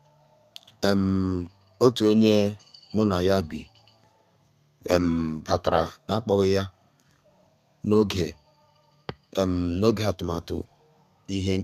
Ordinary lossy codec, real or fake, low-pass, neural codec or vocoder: none; fake; 14.4 kHz; codec, 32 kHz, 1.9 kbps, SNAC